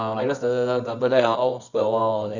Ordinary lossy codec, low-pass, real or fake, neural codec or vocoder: none; 7.2 kHz; fake; codec, 24 kHz, 0.9 kbps, WavTokenizer, medium music audio release